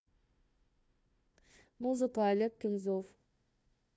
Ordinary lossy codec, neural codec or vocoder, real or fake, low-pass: none; codec, 16 kHz, 1 kbps, FunCodec, trained on Chinese and English, 50 frames a second; fake; none